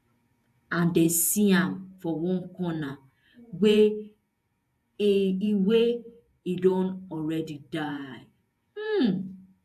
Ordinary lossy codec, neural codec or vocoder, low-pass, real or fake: AAC, 96 kbps; vocoder, 48 kHz, 128 mel bands, Vocos; 14.4 kHz; fake